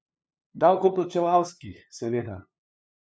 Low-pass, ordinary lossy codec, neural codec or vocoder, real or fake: none; none; codec, 16 kHz, 2 kbps, FunCodec, trained on LibriTTS, 25 frames a second; fake